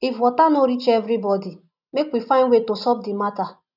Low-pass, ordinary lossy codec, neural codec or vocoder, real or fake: 5.4 kHz; none; none; real